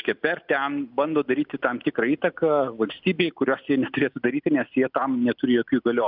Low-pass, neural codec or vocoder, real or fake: 5.4 kHz; none; real